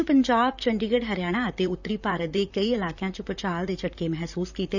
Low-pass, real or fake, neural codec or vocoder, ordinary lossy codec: 7.2 kHz; fake; vocoder, 44.1 kHz, 128 mel bands, Pupu-Vocoder; none